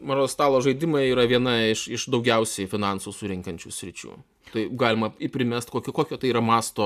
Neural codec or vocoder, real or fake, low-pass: none; real; 14.4 kHz